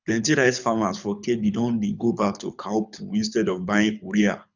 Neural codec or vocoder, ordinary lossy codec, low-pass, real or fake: codec, 24 kHz, 6 kbps, HILCodec; none; 7.2 kHz; fake